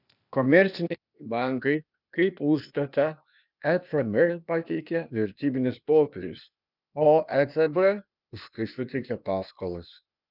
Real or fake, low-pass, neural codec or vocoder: fake; 5.4 kHz; codec, 16 kHz, 0.8 kbps, ZipCodec